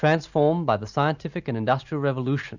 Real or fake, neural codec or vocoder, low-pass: real; none; 7.2 kHz